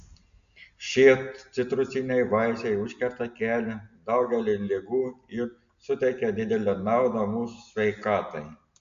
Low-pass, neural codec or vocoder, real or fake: 7.2 kHz; none; real